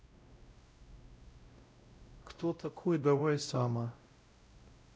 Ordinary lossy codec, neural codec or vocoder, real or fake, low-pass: none; codec, 16 kHz, 0.5 kbps, X-Codec, WavLM features, trained on Multilingual LibriSpeech; fake; none